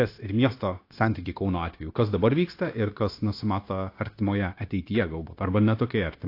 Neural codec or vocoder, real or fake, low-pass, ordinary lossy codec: codec, 16 kHz, 0.9 kbps, LongCat-Audio-Codec; fake; 5.4 kHz; AAC, 32 kbps